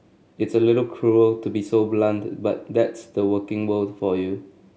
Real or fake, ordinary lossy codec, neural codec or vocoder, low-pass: real; none; none; none